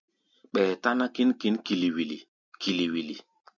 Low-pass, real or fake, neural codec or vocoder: 7.2 kHz; real; none